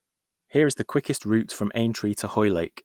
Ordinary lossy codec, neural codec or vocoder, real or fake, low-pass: Opus, 24 kbps; none; real; 19.8 kHz